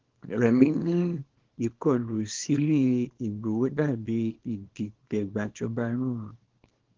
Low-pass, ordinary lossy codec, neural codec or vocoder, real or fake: 7.2 kHz; Opus, 16 kbps; codec, 24 kHz, 0.9 kbps, WavTokenizer, small release; fake